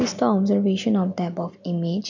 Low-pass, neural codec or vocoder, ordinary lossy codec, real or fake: 7.2 kHz; none; none; real